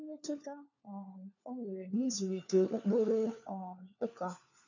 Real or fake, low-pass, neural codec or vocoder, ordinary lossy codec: fake; 7.2 kHz; codec, 16 kHz, 4 kbps, FunCodec, trained on LibriTTS, 50 frames a second; none